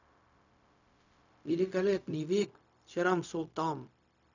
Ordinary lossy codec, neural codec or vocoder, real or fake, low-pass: none; codec, 16 kHz, 0.4 kbps, LongCat-Audio-Codec; fake; 7.2 kHz